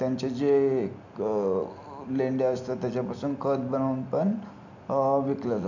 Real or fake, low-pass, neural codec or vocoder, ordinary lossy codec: real; 7.2 kHz; none; none